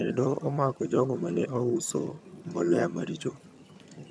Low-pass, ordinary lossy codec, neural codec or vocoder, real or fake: none; none; vocoder, 22.05 kHz, 80 mel bands, HiFi-GAN; fake